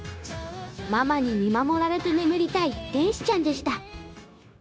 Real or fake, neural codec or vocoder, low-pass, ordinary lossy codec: fake; codec, 16 kHz, 0.9 kbps, LongCat-Audio-Codec; none; none